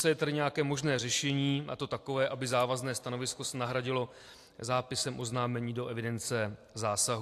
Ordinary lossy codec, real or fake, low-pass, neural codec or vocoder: AAC, 64 kbps; real; 14.4 kHz; none